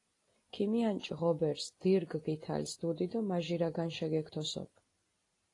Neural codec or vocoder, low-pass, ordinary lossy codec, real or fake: none; 10.8 kHz; AAC, 32 kbps; real